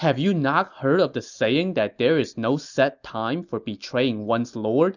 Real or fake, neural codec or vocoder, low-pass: real; none; 7.2 kHz